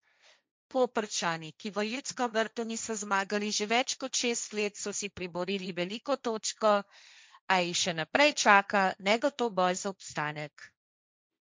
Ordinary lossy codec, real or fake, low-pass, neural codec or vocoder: none; fake; none; codec, 16 kHz, 1.1 kbps, Voila-Tokenizer